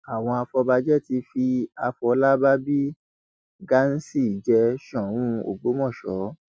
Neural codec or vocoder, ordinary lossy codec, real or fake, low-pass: none; none; real; none